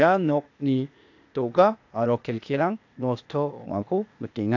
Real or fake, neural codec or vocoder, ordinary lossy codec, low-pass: fake; codec, 16 kHz, 0.8 kbps, ZipCodec; AAC, 48 kbps; 7.2 kHz